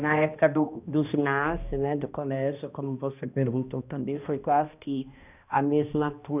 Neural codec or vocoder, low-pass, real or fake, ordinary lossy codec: codec, 16 kHz, 1 kbps, X-Codec, HuBERT features, trained on balanced general audio; 3.6 kHz; fake; none